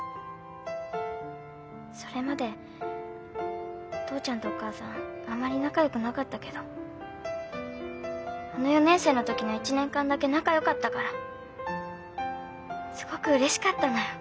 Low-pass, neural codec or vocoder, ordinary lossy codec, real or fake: none; none; none; real